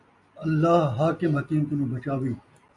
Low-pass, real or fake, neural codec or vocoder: 10.8 kHz; real; none